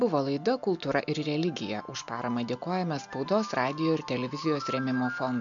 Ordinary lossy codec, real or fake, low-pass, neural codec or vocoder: MP3, 64 kbps; real; 7.2 kHz; none